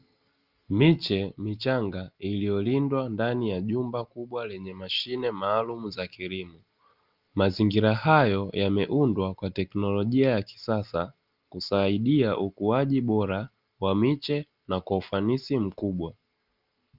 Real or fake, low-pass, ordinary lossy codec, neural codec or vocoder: real; 5.4 kHz; Opus, 24 kbps; none